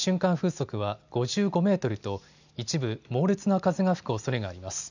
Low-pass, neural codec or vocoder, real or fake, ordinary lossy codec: 7.2 kHz; none; real; none